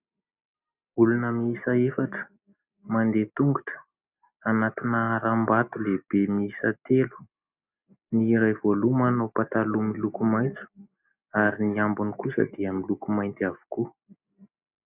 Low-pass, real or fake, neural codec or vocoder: 3.6 kHz; real; none